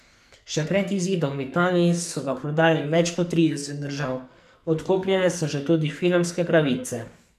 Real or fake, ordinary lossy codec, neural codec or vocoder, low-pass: fake; none; codec, 44.1 kHz, 2.6 kbps, SNAC; 14.4 kHz